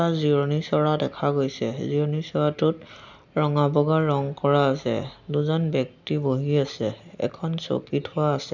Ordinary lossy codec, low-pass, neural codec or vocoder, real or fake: none; 7.2 kHz; none; real